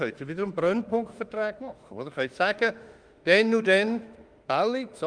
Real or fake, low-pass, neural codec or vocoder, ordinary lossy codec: fake; 9.9 kHz; autoencoder, 48 kHz, 32 numbers a frame, DAC-VAE, trained on Japanese speech; Opus, 32 kbps